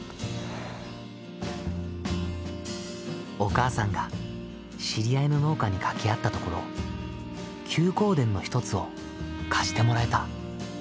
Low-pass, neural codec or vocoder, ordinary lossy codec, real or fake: none; none; none; real